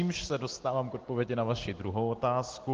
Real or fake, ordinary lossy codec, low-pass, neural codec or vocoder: real; Opus, 16 kbps; 7.2 kHz; none